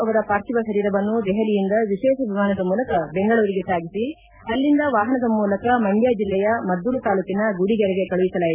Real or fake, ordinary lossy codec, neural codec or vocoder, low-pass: real; none; none; 3.6 kHz